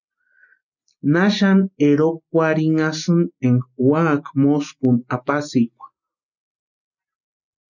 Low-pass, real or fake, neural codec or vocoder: 7.2 kHz; real; none